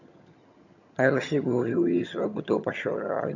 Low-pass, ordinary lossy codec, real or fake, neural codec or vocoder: 7.2 kHz; none; fake; vocoder, 22.05 kHz, 80 mel bands, HiFi-GAN